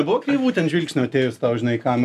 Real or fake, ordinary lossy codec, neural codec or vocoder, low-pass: real; AAC, 96 kbps; none; 14.4 kHz